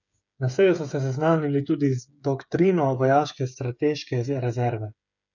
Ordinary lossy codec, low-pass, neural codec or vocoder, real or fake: none; 7.2 kHz; codec, 16 kHz, 8 kbps, FreqCodec, smaller model; fake